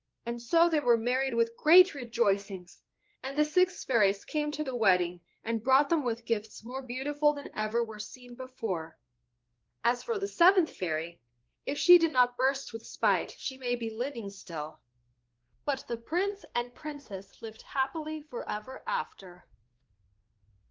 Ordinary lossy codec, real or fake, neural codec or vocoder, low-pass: Opus, 16 kbps; fake; codec, 16 kHz, 2 kbps, X-Codec, WavLM features, trained on Multilingual LibriSpeech; 7.2 kHz